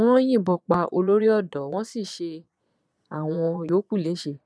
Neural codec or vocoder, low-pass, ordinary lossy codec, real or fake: vocoder, 22.05 kHz, 80 mel bands, Vocos; none; none; fake